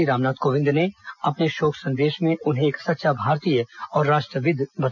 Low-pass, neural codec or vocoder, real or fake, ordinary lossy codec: 7.2 kHz; none; real; none